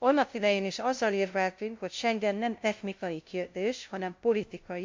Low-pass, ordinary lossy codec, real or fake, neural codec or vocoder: 7.2 kHz; MP3, 48 kbps; fake; codec, 16 kHz, 0.5 kbps, FunCodec, trained on LibriTTS, 25 frames a second